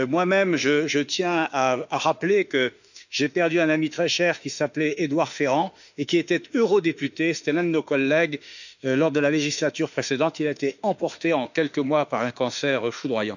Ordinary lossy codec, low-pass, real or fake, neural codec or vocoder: none; 7.2 kHz; fake; autoencoder, 48 kHz, 32 numbers a frame, DAC-VAE, trained on Japanese speech